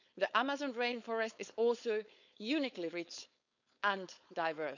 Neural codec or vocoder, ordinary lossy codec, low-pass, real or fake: codec, 16 kHz, 4.8 kbps, FACodec; none; 7.2 kHz; fake